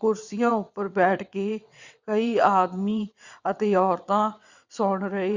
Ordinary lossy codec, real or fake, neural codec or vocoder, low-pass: Opus, 64 kbps; fake; vocoder, 22.05 kHz, 80 mel bands, WaveNeXt; 7.2 kHz